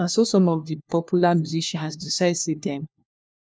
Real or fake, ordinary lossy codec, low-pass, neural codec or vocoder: fake; none; none; codec, 16 kHz, 1 kbps, FunCodec, trained on LibriTTS, 50 frames a second